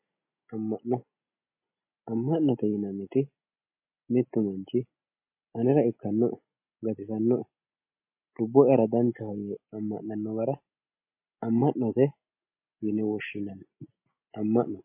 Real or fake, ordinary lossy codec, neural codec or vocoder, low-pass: real; MP3, 24 kbps; none; 3.6 kHz